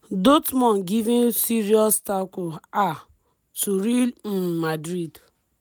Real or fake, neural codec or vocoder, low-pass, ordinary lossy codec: real; none; none; none